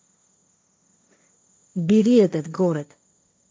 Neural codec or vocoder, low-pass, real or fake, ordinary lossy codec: codec, 16 kHz, 1.1 kbps, Voila-Tokenizer; none; fake; none